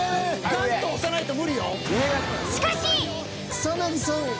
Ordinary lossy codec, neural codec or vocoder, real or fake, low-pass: none; none; real; none